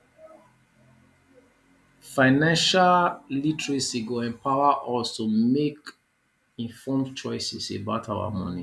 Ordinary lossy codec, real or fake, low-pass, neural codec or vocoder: none; real; none; none